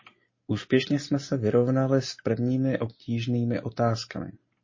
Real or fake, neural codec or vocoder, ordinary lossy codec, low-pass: real; none; MP3, 32 kbps; 7.2 kHz